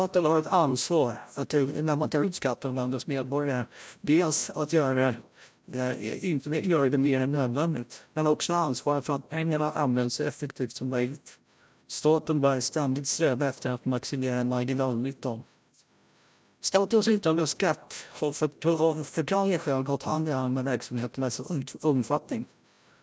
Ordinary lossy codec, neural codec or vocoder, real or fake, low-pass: none; codec, 16 kHz, 0.5 kbps, FreqCodec, larger model; fake; none